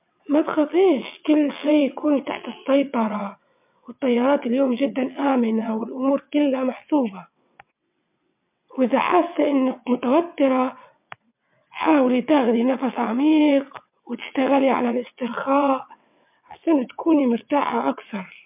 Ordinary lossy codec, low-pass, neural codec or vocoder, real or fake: MP3, 32 kbps; 3.6 kHz; vocoder, 22.05 kHz, 80 mel bands, WaveNeXt; fake